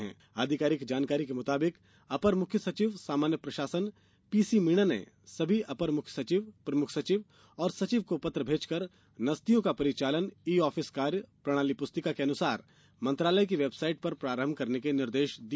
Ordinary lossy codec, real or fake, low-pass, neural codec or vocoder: none; real; none; none